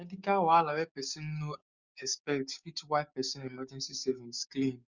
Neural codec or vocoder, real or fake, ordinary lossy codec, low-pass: codec, 16 kHz, 6 kbps, DAC; fake; Opus, 64 kbps; 7.2 kHz